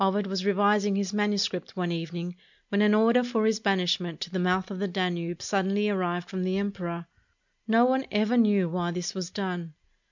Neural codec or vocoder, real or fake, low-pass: none; real; 7.2 kHz